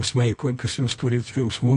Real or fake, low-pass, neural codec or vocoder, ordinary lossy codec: fake; 10.8 kHz; codec, 24 kHz, 0.9 kbps, WavTokenizer, medium music audio release; MP3, 48 kbps